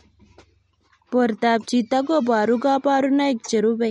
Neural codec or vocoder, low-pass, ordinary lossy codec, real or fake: none; 19.8 kHz; MP3, 48 kbps; real